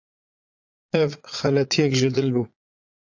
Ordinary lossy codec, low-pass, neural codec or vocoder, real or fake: AAC, 48 kbps; 7.2 kHz; vocoder, 22.05 kHz, 80 mel bands, Vocos; fake